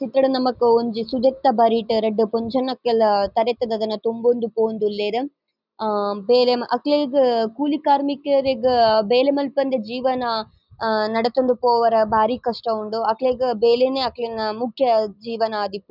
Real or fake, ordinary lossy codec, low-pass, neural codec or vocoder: real; none; 5.4 kHz; none